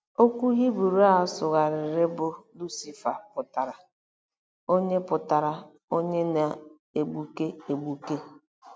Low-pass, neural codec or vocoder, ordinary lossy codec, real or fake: none; none; none; real